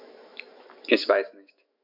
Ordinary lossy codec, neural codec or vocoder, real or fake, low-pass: MP3, 48 kbps; autoencoder, 48 kHz, 128 numbers a frame, DAC-VAE, trained on Japanese speech; fake; 5.4 kHz